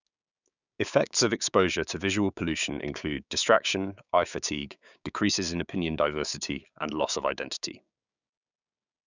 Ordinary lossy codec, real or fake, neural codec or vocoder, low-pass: none; fake; codec, 16 kHz, 6 kbps, DAC; 7.2 kHz